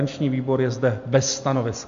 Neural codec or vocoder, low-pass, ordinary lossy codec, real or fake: none; 7.2 kHz; MP3, 64 kbps; real